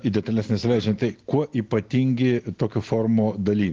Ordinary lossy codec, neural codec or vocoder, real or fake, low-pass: Opus, 16 kbps; none; real; 7.2 kHz